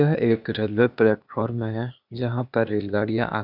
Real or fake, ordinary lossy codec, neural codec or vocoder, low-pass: fake; none; codec, 16 kHz, 0.8 kbps, ZipCodec; 5.4 kHz